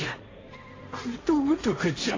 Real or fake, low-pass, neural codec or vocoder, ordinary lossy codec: fake; 7.2 kHz; codec, 16 kHz, 1.1 kbps, Voila-Tokenizer; AAC, 32 kbps